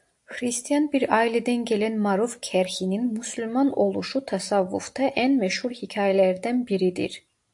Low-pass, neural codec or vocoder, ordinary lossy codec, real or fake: 10.8 kHz; none; AAC, 64 kbps; real